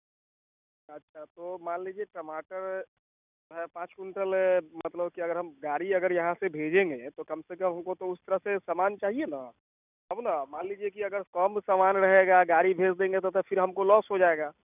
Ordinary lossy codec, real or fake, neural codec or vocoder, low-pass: none; real; none; 3.6 kHz